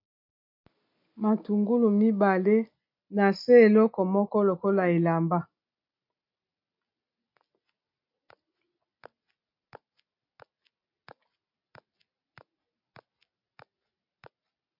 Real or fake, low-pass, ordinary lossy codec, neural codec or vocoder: real; 5.4 kHz; MP3, 32 kbps; none